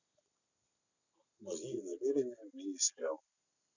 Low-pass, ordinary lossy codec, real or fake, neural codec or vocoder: 7.2 kHz; none; real; none